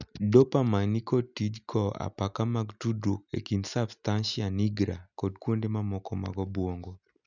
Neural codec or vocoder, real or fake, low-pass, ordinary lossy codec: none; real; 7.2 kHz; none